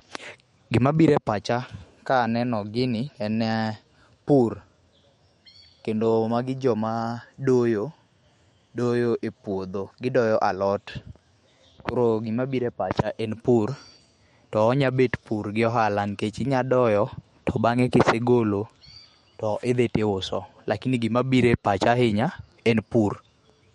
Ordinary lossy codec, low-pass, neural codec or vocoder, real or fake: MP3, 48 kbps; 19.8 kHz; autoencoder, 48 kHz, 128 numbers a frame, DAC-VAE, trained on Japanese speech; fake